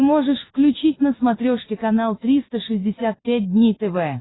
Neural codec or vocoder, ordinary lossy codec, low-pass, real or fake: none; AAC, 16 kbps; 7.2 kHz; real